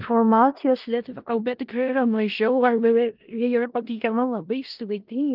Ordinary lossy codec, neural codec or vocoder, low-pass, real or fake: Opus, 24 kbps; codec, 16 kHz in and 24 kHz out, 0.4 kbps, LongCat-Audio-Codec, four codebook decoder; 5.4 kHz; fake